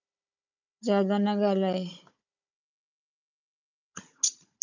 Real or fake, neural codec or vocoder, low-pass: fake; codec, 16 kHz, 16 kbps, FunCodec, trained on Chinese and English, 50 frames a second; 7.2 kHz